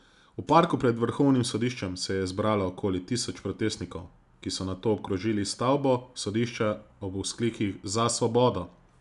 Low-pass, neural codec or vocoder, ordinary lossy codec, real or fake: 10.8 kHz; none; none; real